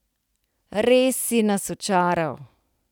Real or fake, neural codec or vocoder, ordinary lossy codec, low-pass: real; none; none; none